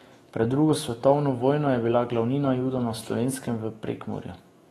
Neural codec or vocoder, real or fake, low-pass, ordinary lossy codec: autoencoder, 48 kHz, 128 numbers a frame, DAC-VAE, trained on Japanese speech; fake; 19.8 kHz; AAC, 32 kbps